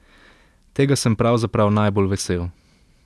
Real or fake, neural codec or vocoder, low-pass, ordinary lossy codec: real; none; none; none